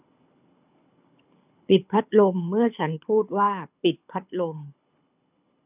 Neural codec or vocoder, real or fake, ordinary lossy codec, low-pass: codec, 24 kHz, 6 kbps, HILCodec; fake; none; 3.6 kHz